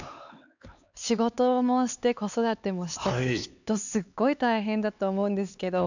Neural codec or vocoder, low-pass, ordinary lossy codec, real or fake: codec, 16 kHz, 4 kbps, X-Codec, HuBERT features, trained on LibriSpeech; 7.2 kHz; none; fake